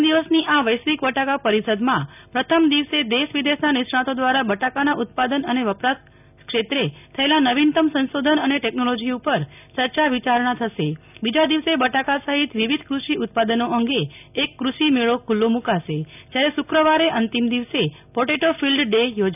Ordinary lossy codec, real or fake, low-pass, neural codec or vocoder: none; real; 3.6 kHz; none